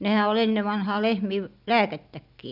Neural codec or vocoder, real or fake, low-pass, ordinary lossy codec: none; real; 5.4 kHz; none